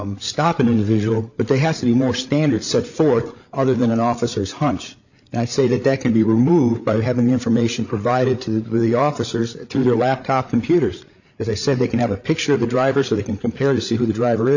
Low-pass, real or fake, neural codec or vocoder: 7.2 kHz; fake; codec, 16 kHz, 8 kbps, FreqCodec, larger model